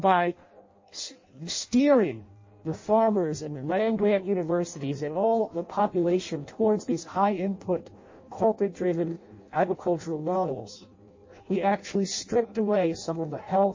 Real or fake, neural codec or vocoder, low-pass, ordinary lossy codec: fake; codec, 16 kHz in and 24 kHz out, 0.6 kbps, FireRedTTS-2 codec; 7.2 kHz; MP3, 32 kbps